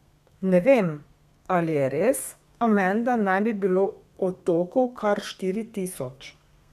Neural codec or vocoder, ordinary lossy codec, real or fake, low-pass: codec, 32 kHz, 1.9 kbps, SNAC; none; fake; 14.4 kHz